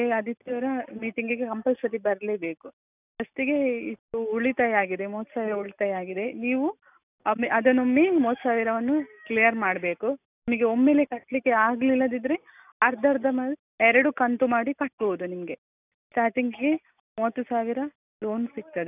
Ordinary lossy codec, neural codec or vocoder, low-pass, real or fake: none; none; 3.6 kHz; real